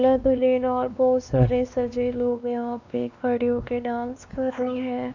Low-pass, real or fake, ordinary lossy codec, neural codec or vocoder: 7.2 kHz; fake; none; codec, 16 kHz, 2 kbps, X-Codec, WavLM features, trained on Multilingual LibriSpeech